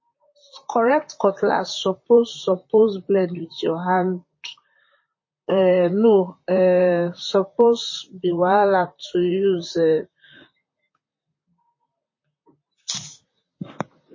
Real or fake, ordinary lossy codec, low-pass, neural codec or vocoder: fake; MP3, 32 kbps; 7.2 kHz; vocoder, 44.1 kHz, 128 mel bands, Pupu-Vocoder